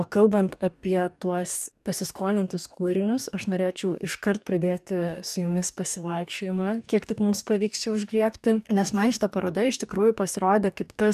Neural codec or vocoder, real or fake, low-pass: codec, 44.1 kHz, 2.6 kbps, DAC; fake; 14.4 kHz